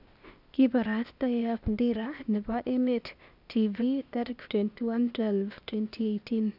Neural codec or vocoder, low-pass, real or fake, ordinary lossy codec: codec, 16 kHz, 0.8 kbps, ZipCodec; 5.4 kHz; fake; none